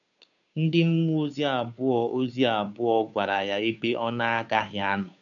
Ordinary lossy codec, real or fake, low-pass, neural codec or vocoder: MP3, 96 kbps; fake; 7.2 kHz; codec, 16 kHz, 2 kbps, FunCodec, trained on Chinese and English, 25 frames a second